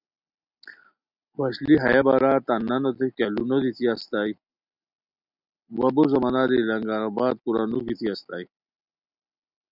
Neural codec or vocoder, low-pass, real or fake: none; 5.4 kHz; real